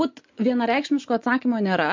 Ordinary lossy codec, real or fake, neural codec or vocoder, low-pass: MP3, 48 kbps; real; none; 7.2 kHz